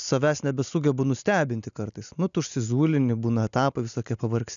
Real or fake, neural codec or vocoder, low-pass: real; none; 7.2 kHz